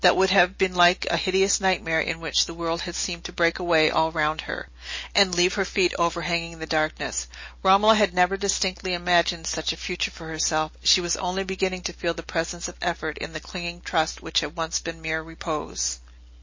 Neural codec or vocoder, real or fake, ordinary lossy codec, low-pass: none; real; MP3, 32 kbps; 7.2 kHz